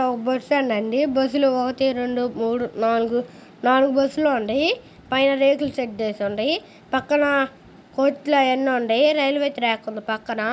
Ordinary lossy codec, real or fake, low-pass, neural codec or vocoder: none; real; none; none